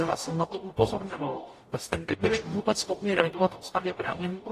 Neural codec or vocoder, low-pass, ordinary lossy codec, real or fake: codec, 44.1 kHz, 0.9 kbps, DAC; 14.4 kHz; AAC, 48 kbps; fake